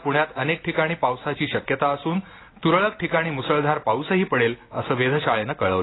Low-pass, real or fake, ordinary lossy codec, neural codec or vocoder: 7.2 kHz; real; AAC, 16 kbps; none